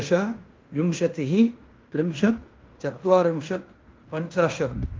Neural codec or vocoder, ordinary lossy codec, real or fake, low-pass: codec, 16 kHz in and 24 kHz out, 0.9 kbps, LongCat-Audio-Codec, fine tuned four codebook decoder; Opus, 32 kbps; fake; 7.2 kHz